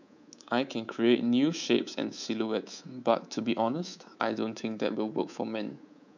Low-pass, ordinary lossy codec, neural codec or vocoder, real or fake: 7.2 kHz; none; codec, 24 kHz, 3.1 kbps, DualCodec; fake